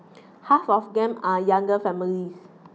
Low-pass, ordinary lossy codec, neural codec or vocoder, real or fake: none; none; none; real